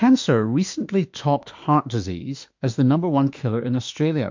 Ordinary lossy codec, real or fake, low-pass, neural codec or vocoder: MP3, 64 kbps; fake; 7.2 kHz; autoencoder, 48 kHz, 32 numbers a frame, DAC-VAE, trained on Japanese speech